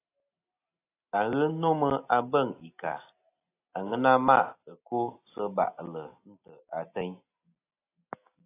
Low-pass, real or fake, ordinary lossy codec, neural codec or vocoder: 3.6 kHz; real; AAC, 24 kbps; none